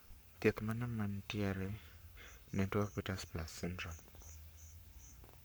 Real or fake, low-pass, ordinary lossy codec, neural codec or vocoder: fake; none; none; codec, 44.1 kHz, 7.8 kbps, Pupu-Codec